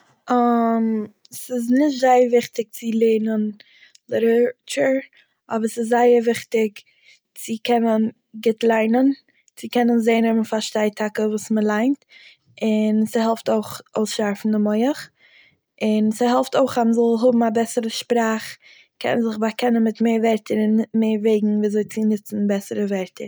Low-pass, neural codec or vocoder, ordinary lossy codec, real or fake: none; none; none; real